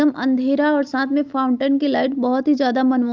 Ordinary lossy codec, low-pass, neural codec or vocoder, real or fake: Opus, 24 kbps; 7.2 kHz; none; real